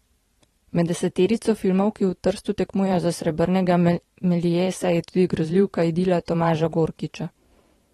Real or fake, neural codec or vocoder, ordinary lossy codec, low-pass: fake; vocoder, 44.1 kHz, 128 mel bands every 512 samples, BigVGAN v2; AAC, 32 kbps; 19.8 kHz